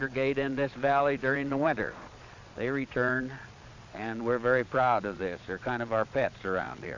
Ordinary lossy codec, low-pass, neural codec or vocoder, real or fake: AAC, 48 kbps; 7.2 kHz; vocoder, 22.05 kHz, 80 mel bands, Vocos; fake